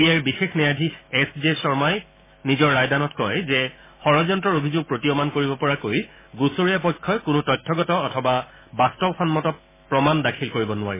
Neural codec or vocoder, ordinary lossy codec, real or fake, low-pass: autoencoder, 48 kHz, 128 numbers a frame, DAC-VAE, trained on Japanese speech; MP3, 16 kbps; fake; 3.6 kHz